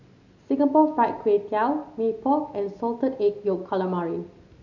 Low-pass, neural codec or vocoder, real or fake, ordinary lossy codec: 7.2 kHz; none; real; none